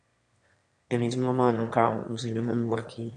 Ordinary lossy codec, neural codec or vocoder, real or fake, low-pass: AAC, 64 kbps; autoencoder, 22.05 kHz, a latent of 192 numbers a frame, VITS, trained on one speaker; fake; 9.9 kHz